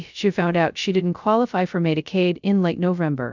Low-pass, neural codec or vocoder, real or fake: 7.2 kHz; codec, 16 kHz, 0.2 kbps, FocalCodec; fake